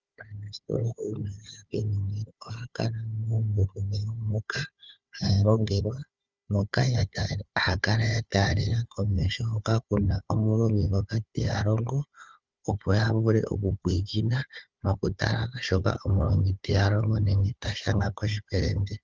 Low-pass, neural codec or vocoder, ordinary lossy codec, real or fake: 7.2 kHz; codec, 16 kHz, 4 kbps, FunCodec, trained on Chinese and English, 50 frames a second; Opus, 32 kbps; fake